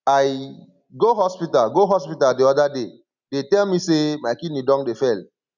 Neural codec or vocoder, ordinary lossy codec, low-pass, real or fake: none; none; 7.2 kHz; real